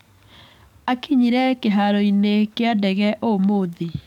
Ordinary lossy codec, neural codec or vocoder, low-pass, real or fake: none; codec, 44.1 kHz, 7.8 kbps, DAC; 19.8 kHz; fake